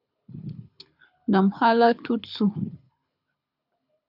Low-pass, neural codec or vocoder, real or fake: 5.4 kHz; codec, 24 kHz, 6 kbps, HILCodec; fake